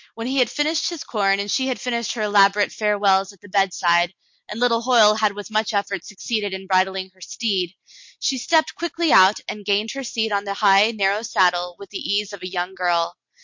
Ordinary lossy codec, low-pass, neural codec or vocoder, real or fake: MP3, 48 kbps; 7.2 kHz; none; real